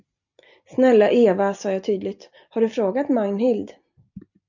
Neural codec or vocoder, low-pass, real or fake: none; 7.2 kHz; real